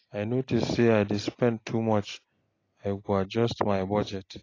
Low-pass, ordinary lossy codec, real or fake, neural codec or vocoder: 7.2 kHz; AAC, 32 kbps; real; none